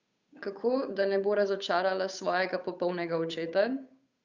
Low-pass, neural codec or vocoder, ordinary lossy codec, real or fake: 7.2 kHz; codec, 16 kHz, 8 kbps, FunCodec, trained on Chinese and English, 25 frames a second; Opus, 64 kbps; fake